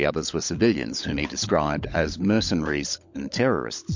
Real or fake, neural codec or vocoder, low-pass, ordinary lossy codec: fake; codec, 16 kHz, 16 kbps, FunCodec, trained on LibriTTS, 50 frames a second; 7.2 kHz; MP3, 48 kbps